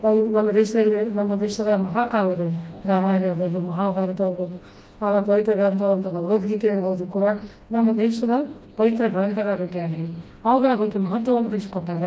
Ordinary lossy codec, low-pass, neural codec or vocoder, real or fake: none; none; codec, 16 kHz, 1 kbps, FreqCodec, smaller model; fake